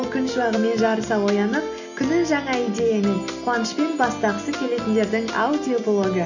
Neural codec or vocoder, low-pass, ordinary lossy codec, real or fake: none; 7.2 kHz; none; real